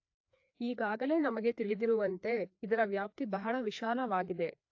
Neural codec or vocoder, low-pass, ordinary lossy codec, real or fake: codec, 16 kHz, 2 kbps, FreqCodec, larger model; 7.2 kHz; AAC, 48 kbps; fake